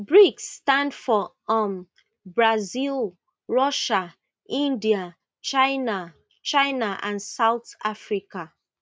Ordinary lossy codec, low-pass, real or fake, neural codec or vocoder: none; none; real; none